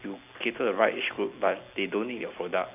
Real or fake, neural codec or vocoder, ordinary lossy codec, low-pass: real; none; AAC, 32 kbps; 3.6 kHz